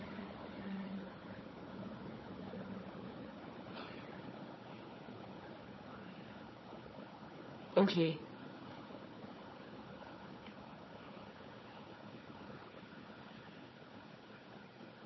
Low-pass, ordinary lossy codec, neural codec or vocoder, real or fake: 7.2 kHz; MP3, 24 kbps; codec, 16 kHz, 4 kbps, FunCodec, trained on Chinese and English, 50 frames a second; fake